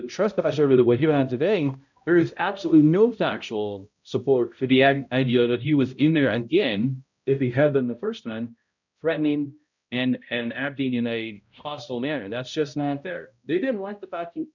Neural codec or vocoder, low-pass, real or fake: codec, 16 kHz, 0.5 kbps, X-Codec, HuBERT features, trained on balanced general audio; 7.2 kHz; fake